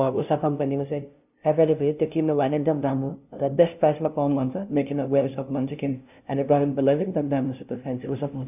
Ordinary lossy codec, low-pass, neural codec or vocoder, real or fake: none; 3.6 kHz; codec, 16 kHz, 0.5 kbps, FunCodec, trained on LibriTTS, 25 frames a second; fake